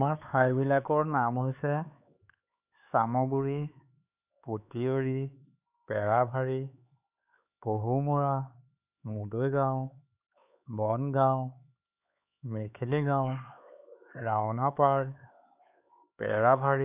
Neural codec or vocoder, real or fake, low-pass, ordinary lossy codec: codec, 16 kHz, 4 kbps, X-Codec, HuBERT features, trained on LibriSpeech; fake; 3.6 kHz; none